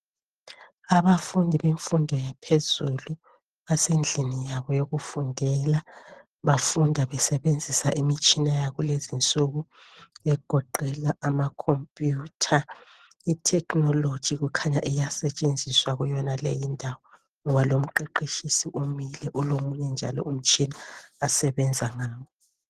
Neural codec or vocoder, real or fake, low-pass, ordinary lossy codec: none; real; 14.4 kHz; Opus, 16 kbps